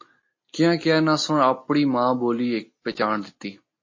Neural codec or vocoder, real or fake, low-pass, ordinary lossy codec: none; real; 7.2 kHz; MP3, 32 kbps